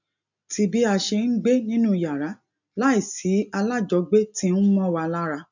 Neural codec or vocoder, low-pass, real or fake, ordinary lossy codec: none; 7.2 kHz; real; none